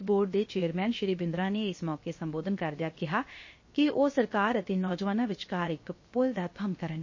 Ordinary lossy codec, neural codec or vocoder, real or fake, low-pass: MP3, 32 kbps; codec, 16 kHz, 0.7 kbps, FocalCodec; fake; 7.2 kHz